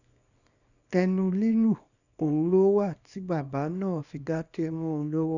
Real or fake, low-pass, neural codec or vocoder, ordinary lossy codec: fake; 7.2 kHz; codec, 24 kHz, 0.9 kbps, WavTokenizer, small release; none